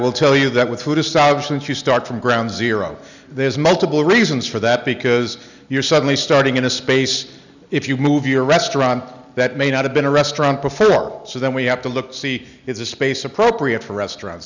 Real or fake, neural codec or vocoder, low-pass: real; none; 7.2 kHz